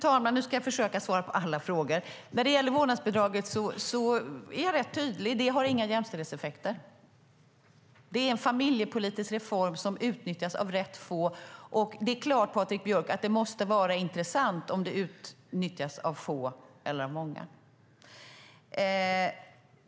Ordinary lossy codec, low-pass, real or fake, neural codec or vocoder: none; none; real; none